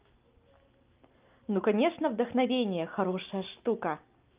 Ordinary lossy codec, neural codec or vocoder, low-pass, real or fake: Opus, 24 kbps; none; 3.6 kHz; real